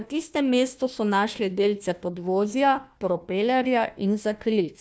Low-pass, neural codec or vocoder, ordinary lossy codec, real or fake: none; codec, 16 kHz, 1 kbps, FunCodec, trained on Chinese and English, 50 frames a second; none; fake